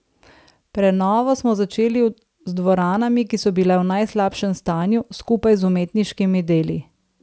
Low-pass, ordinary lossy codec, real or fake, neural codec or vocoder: none; none; real; none